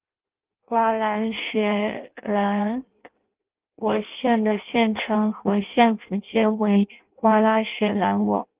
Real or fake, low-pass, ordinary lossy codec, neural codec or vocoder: fake; 3.6 kHz; Opus, 32 kbps; codec, 16 kHz in and 24 kHz out, 0.6 kbps, FireRedTTS-2 codec